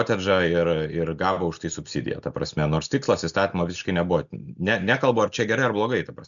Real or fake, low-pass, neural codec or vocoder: real; 7.2 kHz; none